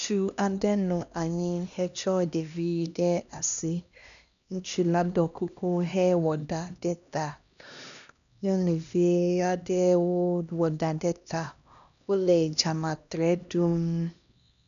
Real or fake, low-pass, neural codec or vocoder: fake; 7.2 kHz; codec, 16 kHz, 1 kbps, X-Codec, HuBERT features, trained on LibriSpeech